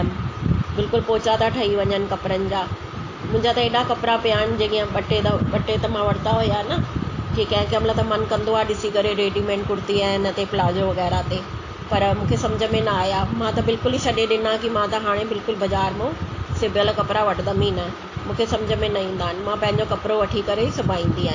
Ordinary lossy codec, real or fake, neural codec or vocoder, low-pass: AAC, 32 kbps; real; none; 7.2 kHz